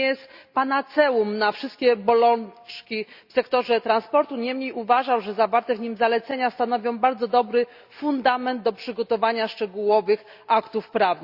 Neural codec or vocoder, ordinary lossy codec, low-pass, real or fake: none; Opus, 64 kbps; 5.4 kHz; real